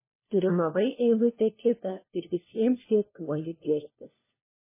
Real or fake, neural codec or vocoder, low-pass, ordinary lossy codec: fake; codec, 16 kHz, 1 kbps, FunCodec, trained on LibriTTS, 50 frames a second; 3.6 kHz; MP3, 16 kbps